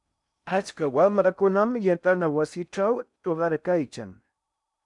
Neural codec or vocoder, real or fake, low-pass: codec, 16 kHz in and 24 kHz out, 0.6 kbps, FocalCodec, streaming, 2048 codes; fake; 10.8 kHz